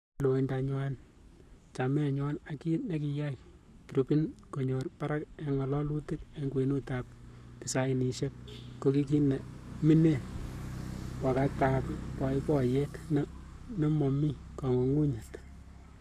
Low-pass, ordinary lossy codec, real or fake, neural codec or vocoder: 14.4 kHz; none; fake; codec, 44.1 kHz, 7.8 kbps, Pupu-Codec